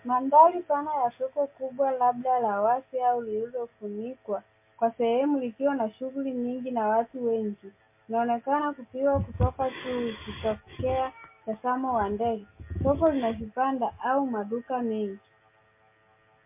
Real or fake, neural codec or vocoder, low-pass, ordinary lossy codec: real; none; 3.6 kHz; MP3, 24 kbps